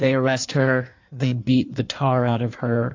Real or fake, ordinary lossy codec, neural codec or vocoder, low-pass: fake; AAC, 48 kbps; codec, 16 kHz in and 24 kHz out, 1.1 kbps, FireRedTTS-2 codec; 7.2 kHz